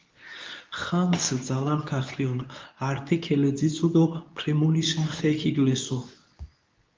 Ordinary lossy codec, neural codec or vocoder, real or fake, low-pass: Opus, 24 kbps; codec, 24 kHz, 0.9 kbps, WavTokenizer, medium speech release version 2; fake; 7.2 kHz